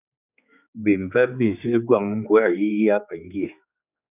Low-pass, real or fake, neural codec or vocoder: 3.6 kHz; fake; codec, 16 kHz, 4 kbps, X-Codec, HuBERT features, trained on general audio